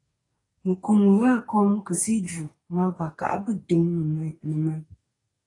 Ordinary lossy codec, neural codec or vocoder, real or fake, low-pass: AAC, 32 kbps; codec, 44.1 kHz, 2.6 kbps, DAC; fake; 10.8 kHz